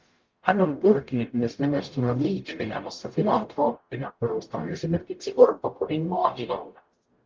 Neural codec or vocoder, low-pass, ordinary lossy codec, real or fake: codec, 44.1 kHz, 0.9 kbps, DAC; 7.2 kHz; Opus, 32 kbps; fake